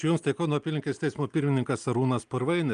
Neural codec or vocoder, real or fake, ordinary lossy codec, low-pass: none; real; Opus, 32 kbps; 9.9 kHz